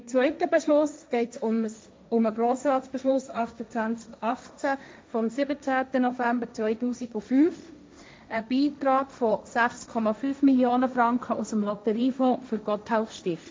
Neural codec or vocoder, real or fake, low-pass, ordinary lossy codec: codec, 16 kHz, 1.1 kbps, Voila-Tokenizer; fake; none; none